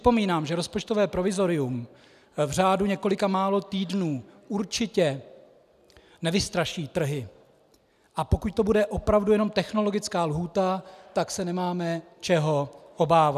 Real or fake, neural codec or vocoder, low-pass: real; none; 14.4 kHz